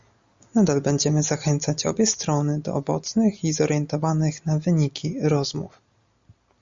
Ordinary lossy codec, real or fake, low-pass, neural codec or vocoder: Opus, 64 kbps; real; 7.2 kHz; none